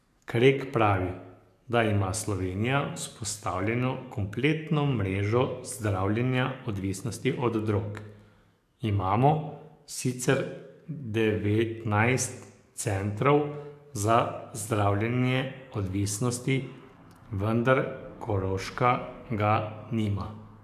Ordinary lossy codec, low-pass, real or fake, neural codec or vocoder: MP3, 96 kbps; 14.4 kHz; fake; codec, 44.1 kHz, 7.8 kbps, DAC